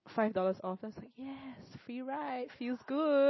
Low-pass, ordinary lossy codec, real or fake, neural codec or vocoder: 7.2 kHz; MP3, 24 kbps; real; none